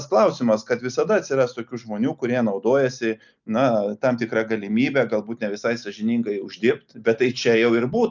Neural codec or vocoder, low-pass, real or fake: none; 7.2 kHz; real